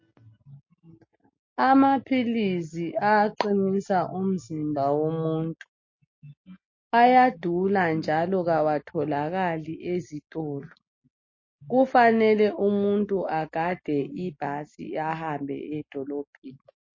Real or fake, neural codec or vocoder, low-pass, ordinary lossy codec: real; none; 7.2 kHz; MP3, 32 kbps